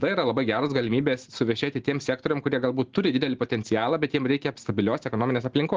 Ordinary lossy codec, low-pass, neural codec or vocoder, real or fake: Opus, 16 kbps; 7.2 kHz; none; real